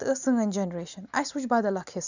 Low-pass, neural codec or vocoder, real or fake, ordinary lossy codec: 7.2 kHz; none; real; none